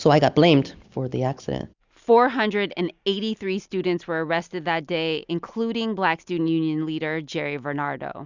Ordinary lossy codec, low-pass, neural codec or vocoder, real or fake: Opus, 64 kbps; 7.2 kHz; none; real